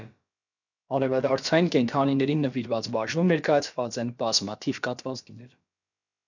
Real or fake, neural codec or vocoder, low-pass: fake; codec, 16 kHz, about 1 kbps, DyCAST, with the encoder's durations; 7.2 kHz